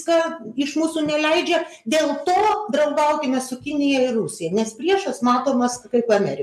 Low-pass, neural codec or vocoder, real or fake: 14.4 kHz; none; real